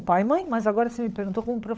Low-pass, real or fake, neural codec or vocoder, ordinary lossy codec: none; fake; codec, 16 kHz, 16 kbps, FunCodec, trained on LibriTTS, 50 frames a second; none